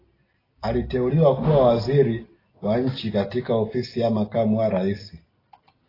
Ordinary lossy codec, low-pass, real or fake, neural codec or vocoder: AAC, 24 kbps; 5.4 kHz; real; none